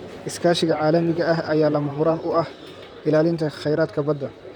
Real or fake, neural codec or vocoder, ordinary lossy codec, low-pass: fake; vocoder, 44.1 kHz, 128 mel bands, Pupu-Vocoder; none; 19.8 kHz